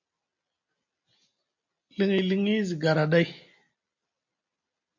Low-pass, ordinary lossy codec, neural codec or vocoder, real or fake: 7.2 kHz; MP3, 48 kbps; none; real